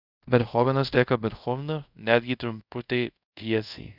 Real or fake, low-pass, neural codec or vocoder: fake; 5.4 kHz; codec, 24 kHz, 0.5 kbps, DualCodec